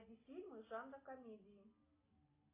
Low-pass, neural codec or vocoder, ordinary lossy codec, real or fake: 3.6 kHz; none; AAC, 24 kbps; real